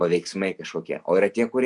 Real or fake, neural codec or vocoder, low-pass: real; none; 10.8 kHz